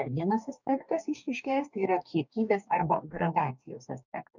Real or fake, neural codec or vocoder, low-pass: fake; codec, 44.1 kHz, 2.6 kbps, DAC; 7.2 kHz